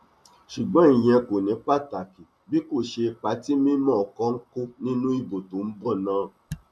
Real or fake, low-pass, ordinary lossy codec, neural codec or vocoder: real; none; none; none